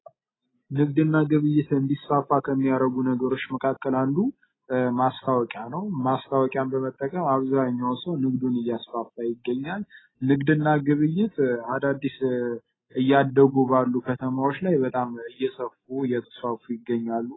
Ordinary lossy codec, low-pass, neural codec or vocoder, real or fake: AAC, 16 kbps; 7.2 kHz; none; real